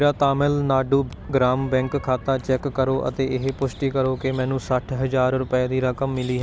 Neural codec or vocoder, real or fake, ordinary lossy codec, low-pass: none; real; none; none